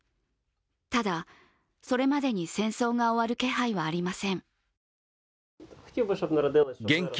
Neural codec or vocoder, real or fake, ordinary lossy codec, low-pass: none; real; none; none